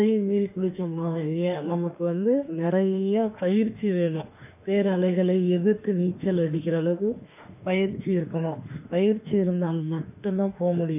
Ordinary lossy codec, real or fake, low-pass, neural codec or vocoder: none; fake; 3.6 kHz; autoencoder, 48 kHz, 32 numbers a frame, DAC-VAE, trained on Japanese speech